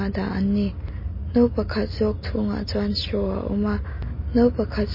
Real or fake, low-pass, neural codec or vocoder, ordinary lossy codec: real; 5.4 kHz; none; MP3, 24 kbps